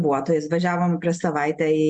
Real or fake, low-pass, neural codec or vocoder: real; 10.8 kHz; none